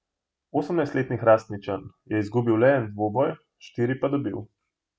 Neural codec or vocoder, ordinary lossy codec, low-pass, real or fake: none; none; none; real